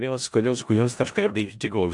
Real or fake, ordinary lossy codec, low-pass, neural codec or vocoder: fake; AAC, 64 kbps; 10.8 kHz; codec, 16 kHz in and 24 kHz out, 0.4 kbps, LongCat-Audio-Codec, four codebook decoder